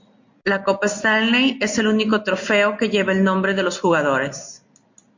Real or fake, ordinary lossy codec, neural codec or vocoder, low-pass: real; MP3, 48 kbps; none; 7.2 kHz